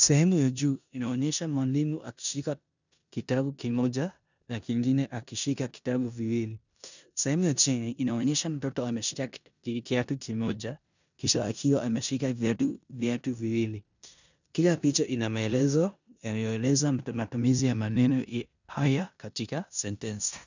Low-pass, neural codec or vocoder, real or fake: 7.2 kHz; codec, 16 kHz in and 24 kHz out, 0.9 kbps, LongCat-Audio-Codec, four codebook decoder; fake